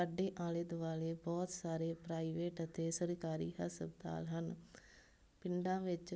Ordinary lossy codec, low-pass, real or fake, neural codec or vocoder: none; none; real; none